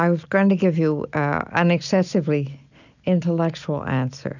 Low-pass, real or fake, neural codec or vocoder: 7.2 kHz; real; none